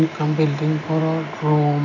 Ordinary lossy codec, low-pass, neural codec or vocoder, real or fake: none; 7.2 kHz; none; real